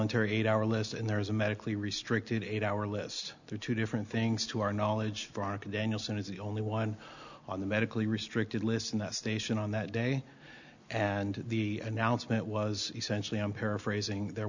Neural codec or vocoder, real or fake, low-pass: none; real; 7.2 kHz